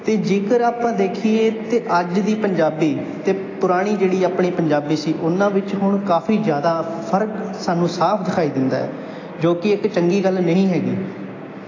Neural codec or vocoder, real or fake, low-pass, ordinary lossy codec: none; real; 7.2 kHz; AAC, 32 kbps